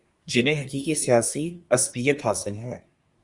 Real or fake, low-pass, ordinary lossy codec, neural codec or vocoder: fake; 10.8 kHz; Opus, 64 kbps; codec, 24 kHz, 1 kbps, SNAC